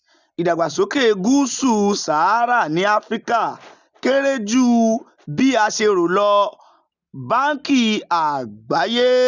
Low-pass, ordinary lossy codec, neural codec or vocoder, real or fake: 7.2 kHz; none; none; real